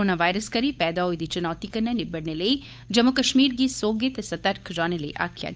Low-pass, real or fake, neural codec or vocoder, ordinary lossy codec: none; fake; codec, 16 kHz, 8 kbps, FunCodec, trained on Chinese and English, 25 frames a second; none